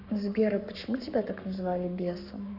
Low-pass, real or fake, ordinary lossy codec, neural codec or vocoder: 5.4 kHz; fake; none; codec, 44.1 kHz, 7.8 kbps, Pupu-Codec